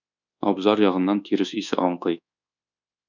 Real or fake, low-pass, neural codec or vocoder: fake; 7.2 kHz; codec, 24 kHz, 1.2 kbps, DualCodec